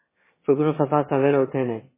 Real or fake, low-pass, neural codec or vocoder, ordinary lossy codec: fake; 3.6 kHz; autoencoder, 22.05 kHz, a latent of 192 numbers a frame, VITS, trained on one speaker; MP3, 16 kbps